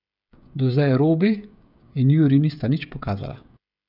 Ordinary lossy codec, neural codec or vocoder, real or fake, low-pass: none; codec, 16 kHz, 16 kbps, FreqCodec, smaller model; fake; 5.4 kHz